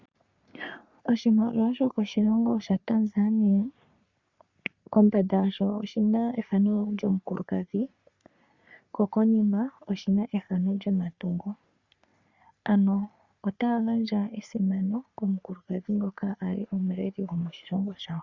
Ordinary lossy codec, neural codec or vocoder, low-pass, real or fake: Opus, 64 kbps; codec, 44.1 kHz, 3.4 kbps, Pupu-Codec; 7.2 kHz; fake